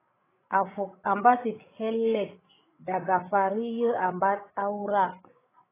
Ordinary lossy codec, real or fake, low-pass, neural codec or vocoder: AAC, 16 kbps; fake; 3.6 kHz; codec, 16 kHz, 8 kbps, FreqCodec, larger model